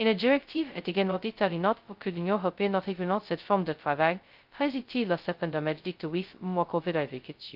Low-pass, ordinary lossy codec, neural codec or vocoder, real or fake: 5.4 kHz; Opus, 24 kbps; codec, 16 kHz, 0.2 kbps, FocalCodec; fake